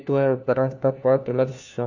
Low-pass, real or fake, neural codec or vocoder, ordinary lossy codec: 7.2 kHz; fake; codec, 16 kHz, 1 kbps, FunCodec, trained on LibriTTS, 50 frames a second; none